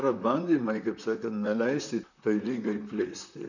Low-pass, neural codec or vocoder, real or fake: 7.2 kHz; vocoder, 44.1 kHz, 128 mel bands, Pupu-Vocoder; fake